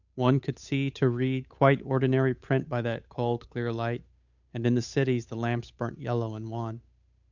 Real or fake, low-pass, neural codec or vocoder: fake; 7.2 kHz; codec, 16 kHz, 8 kbps, FunCodec, trained on Chinese and English, 25 frames a second